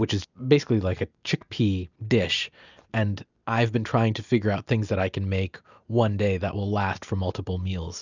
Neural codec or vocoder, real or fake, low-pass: none; real; 7.2 kHz